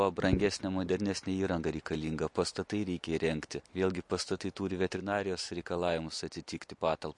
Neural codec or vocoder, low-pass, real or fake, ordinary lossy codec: none; 10.8 kHz; real; MP3, 48 kbps